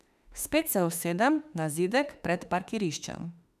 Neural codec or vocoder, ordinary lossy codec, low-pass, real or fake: autoencoder, 48 kHz, 32 numbers a frame, DAC-VAE, trained on Japanese speech; none; 14.4 kHz; fake